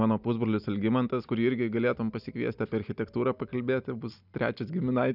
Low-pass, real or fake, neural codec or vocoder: 5.4 kHz; real; none